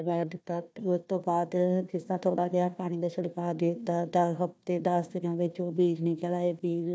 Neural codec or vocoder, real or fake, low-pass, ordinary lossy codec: codec, 16 kHz, 1 kbps, FunCodec, trained on Chinese and English, 50 frames a second; fake; none; none